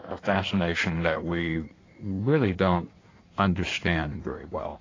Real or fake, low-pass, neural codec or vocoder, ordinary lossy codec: fake; 7.2 kHz; codec, 16 kHz in and 24 kHz out, 1.1 kbps, FireRedTTS-2 codec; AAC, 32 kbps